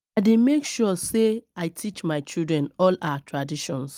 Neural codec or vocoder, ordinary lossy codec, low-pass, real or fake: none; Opus, 32 kbps; 19.8 kHz; real